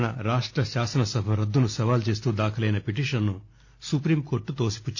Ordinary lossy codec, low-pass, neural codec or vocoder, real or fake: none; 7.2 kHz; none; real